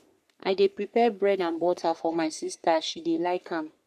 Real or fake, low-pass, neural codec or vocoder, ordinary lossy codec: fake; 14.4 kHz; codec, 44.1 kHz, 3.4 kbps, Pupu-Codec; none